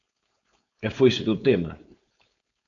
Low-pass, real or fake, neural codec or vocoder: 7.2 kHz; fake; codec, 16 kHz, 4.8 kbps, FACodec